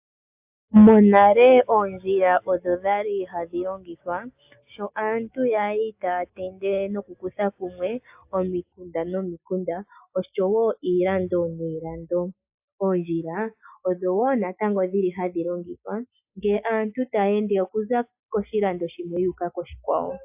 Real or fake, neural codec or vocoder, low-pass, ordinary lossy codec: real; none; 3.6 kHz; AAC, 32 kbps